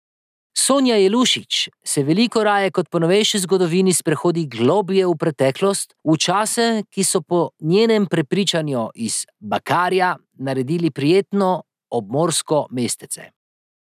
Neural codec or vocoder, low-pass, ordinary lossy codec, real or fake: none; 14.4 kHz; none; real